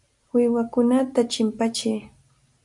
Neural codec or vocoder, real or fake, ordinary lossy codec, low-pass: none; real; AAC, 64 kbps; 10.8 kHz